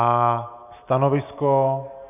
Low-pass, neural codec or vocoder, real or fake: 3.6 kHz; none; real